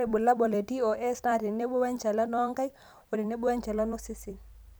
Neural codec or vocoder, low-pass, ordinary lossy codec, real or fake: vocoder, 44.1 kHz, 128 mel bands every 256 samples, BigVGAN v2; none; none; fake